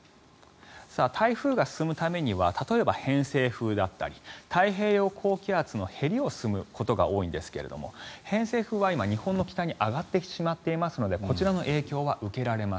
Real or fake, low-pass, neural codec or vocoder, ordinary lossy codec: real; none; none; none